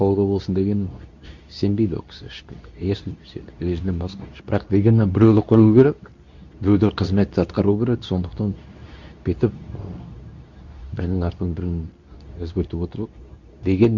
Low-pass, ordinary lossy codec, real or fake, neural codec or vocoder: 7.2 kHz; Opus, 64 kbps; fake; codec, 24 kHz, 0.9 kbps, WavTokenizer, medium speech release version 2